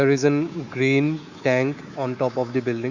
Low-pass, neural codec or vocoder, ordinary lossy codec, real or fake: 7.2 kHz; codec, 24 kHz, 3.1 kbps, DualCodec; Opus, 64 kbps; fake